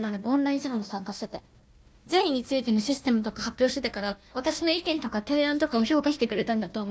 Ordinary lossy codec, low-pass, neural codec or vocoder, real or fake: none; none; codec, 16 kHz, 1 kbps, FunCodec, trained on Chinese and English, 50 frames a second; fake